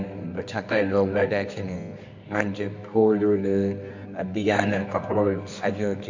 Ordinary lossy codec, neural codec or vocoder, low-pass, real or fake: MP3, 64 kbps; codec, 24 kHz, 0.9 kbps, WavTokenizer, medium music audio release; 7.2 kHz; fake